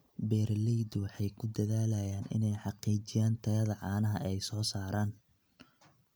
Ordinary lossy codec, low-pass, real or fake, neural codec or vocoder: none; none; real; none